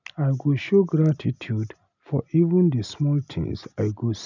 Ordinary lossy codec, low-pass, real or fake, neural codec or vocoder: none; 7.2 kHz; real; none